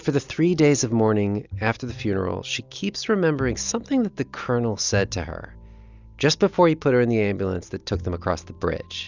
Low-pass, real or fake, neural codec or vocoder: 7.2 kHz; real; none